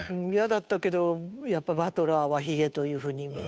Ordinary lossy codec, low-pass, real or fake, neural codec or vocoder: none; none; fake; codec, 16 kHz, 2 kbps, FunCodec, trained on Chinese and English, 25 frames a second